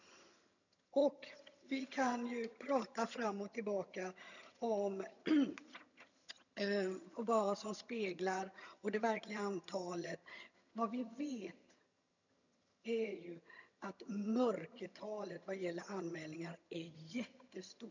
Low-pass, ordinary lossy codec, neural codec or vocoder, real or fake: 7.2 kHz; none; vocoder, 22.05 kHz, 80 mel bands, HiFi-GAN; fake